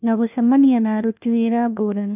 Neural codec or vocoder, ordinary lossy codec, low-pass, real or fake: codec, 16 kHz, 1 kbps, FunCodec, trained on LibriTTS, 50 frames a second; none; 3.6 kHz; fake